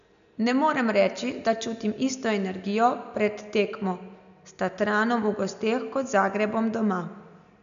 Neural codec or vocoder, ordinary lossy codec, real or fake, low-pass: none; none; real; 7.2 kHz